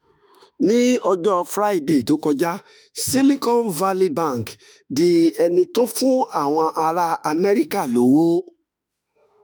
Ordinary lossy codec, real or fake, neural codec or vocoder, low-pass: none; fake; autoencoder, 48 kHz, 32 numbers a frame, DAC-VAE, trained on Japanese speech; none